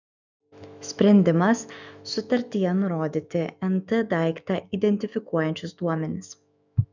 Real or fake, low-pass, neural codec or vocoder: fake; 7.2 kHz; autoencoder, 48 kHz, 128 numbers a frame, DAC-VAE, trained on Japanese speech